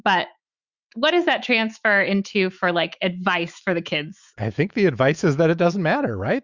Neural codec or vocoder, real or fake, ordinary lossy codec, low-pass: autoencoder, 48 kHz, 128 numbers a frame, DAC-VAE, trained on Japanese speech; fake; Opus, 64 kbps; 7.2 kHz